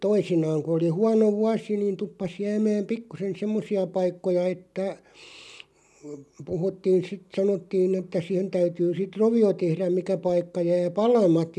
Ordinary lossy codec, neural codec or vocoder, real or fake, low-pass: none; none; real; none